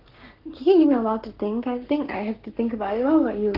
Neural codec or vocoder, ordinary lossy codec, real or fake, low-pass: codec, 44.1 kHz, 7.8 kbps, Pupu-Codec; Opus, 16 kbps; fake; 5.4 kHz